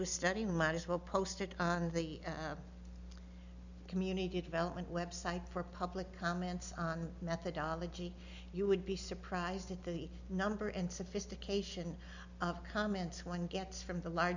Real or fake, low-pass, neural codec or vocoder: real; 7.2 kHz; none